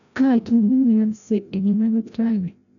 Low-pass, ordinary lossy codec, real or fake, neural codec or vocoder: 7.2 kHz; none; fake; codec, 16 kHz, 0.5 kbps, FreqCodec, larger model